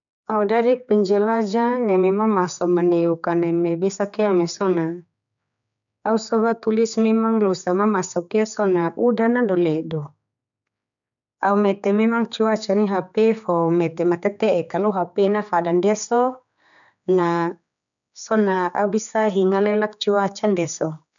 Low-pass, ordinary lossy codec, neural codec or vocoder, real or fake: 7.2 kHz; none; codec, 16 kHz, 4 kbps, X-Codec, HuBERT features, trained on general audio; fake